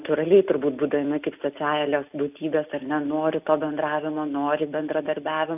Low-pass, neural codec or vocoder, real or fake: 3.6 kHz; none; real